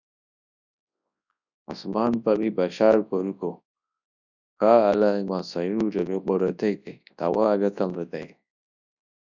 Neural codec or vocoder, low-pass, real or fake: codec, 24 kHz, 0.9 kbps, WavTokenizer, large speech release; 7.2 kHz; fake